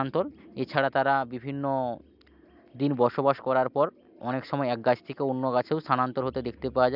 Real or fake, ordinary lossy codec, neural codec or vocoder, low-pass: real; none; none; 5.4 kHz